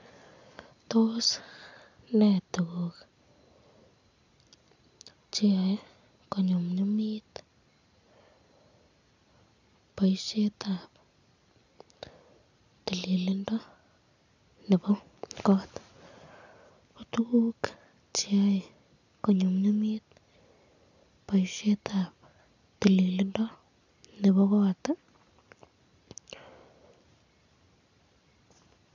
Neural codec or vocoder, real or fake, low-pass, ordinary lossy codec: none; real; 7.2 kHz; none